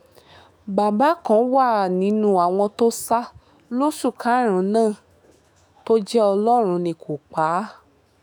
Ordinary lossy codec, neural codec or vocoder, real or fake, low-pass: none; autoencoder, 48 kHz, 128 numbers a frame, DAC-VAE, trained on Japanese speech; fake; 19.8 kHz